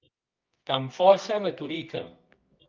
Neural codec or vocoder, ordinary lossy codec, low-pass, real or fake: codec, 24 kHz, 0.9 kbps, WavTokenizer, medium music audio release; Opus, 32 kbps; 7.2 kHz; fake